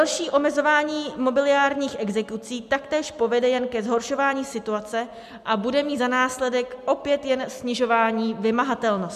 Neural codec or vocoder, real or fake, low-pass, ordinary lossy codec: none; real; 14.4 kHz; MP3, 96 kbps